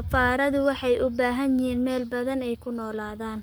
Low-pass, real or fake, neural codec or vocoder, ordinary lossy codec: none; fake; codec, 44.1 kHz, 7.8 kbps, Pupu-Codec; none